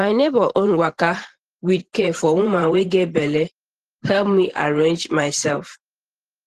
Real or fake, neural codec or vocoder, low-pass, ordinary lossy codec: fake; vocoder, 44.1 kHz, 128 mel bands every 512 samples, BigVGAN v2; 14.4 kHz; Opus, 16 kbps